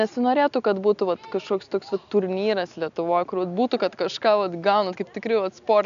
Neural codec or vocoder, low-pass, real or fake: none; 7.2 kHz; real